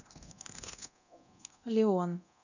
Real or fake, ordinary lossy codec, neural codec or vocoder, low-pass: fake; none; codec, 24 kHz, 0.9 kbps, DualCodec; 7.2 kHz